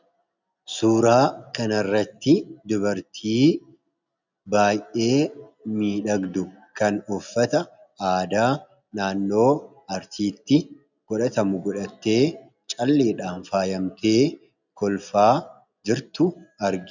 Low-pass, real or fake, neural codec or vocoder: 7.2 kHz; real; none